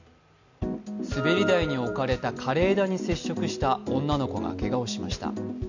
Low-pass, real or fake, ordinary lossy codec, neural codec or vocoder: 7.2 kHz; real; none; none